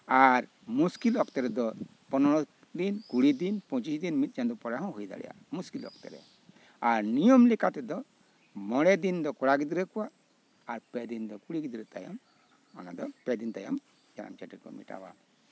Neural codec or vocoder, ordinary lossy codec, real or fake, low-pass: none; none; real; none